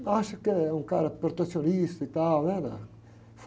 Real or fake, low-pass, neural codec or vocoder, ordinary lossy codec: real; none; none; none